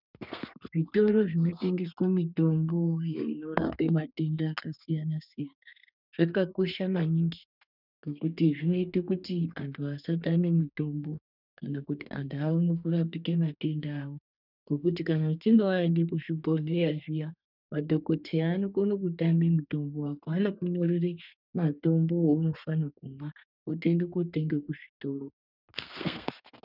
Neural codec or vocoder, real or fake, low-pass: codec, 32 kHz, 1.9 kbps, SNAC; fake; 5.4 kHz